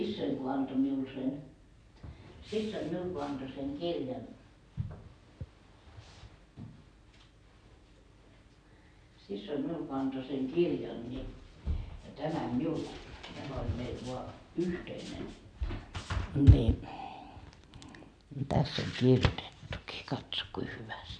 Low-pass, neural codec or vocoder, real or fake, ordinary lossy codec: 9.9 kHz; none; real; none